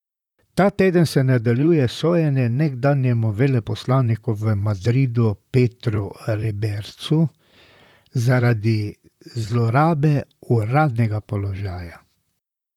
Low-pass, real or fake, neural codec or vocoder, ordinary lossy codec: 19.8 kHz; fake; vocoder, 44.1 kHz, 128 mel bands, Pupu-Vocoder; none